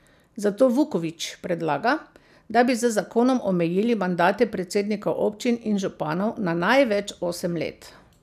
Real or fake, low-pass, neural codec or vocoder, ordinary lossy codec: real; 14.4 kHz; none; none